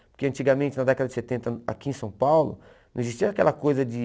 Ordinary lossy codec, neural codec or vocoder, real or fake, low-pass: none; none; real; none